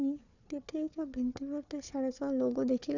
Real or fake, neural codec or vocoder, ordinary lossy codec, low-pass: fake; codec, 16 kHz, 4 kbps, FunCodec, trained on Chinese and English, 50 frames a second; none; 7.2 kHz